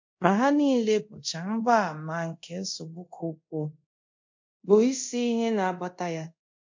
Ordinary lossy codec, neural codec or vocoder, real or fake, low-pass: MP3, 48 kbps; codec, 24 kHz, 0.5 kbps, DualCodec; fake; 7.2 kHz